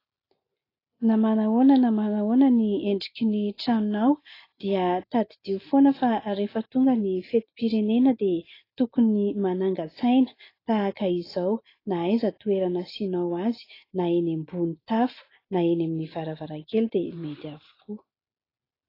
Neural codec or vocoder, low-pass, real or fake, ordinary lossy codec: none; 5.4 kHz; real; AAC, 24 kbps